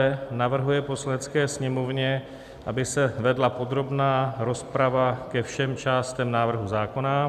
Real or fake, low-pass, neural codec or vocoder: real; 14.4 kHz; none